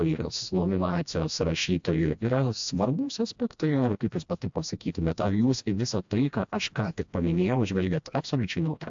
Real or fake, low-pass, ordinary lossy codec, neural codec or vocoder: fake; 7.2 kHz; AAC, 64 kbps; codec, 16 kHz, 1 kbps, FreqCodec, smaller model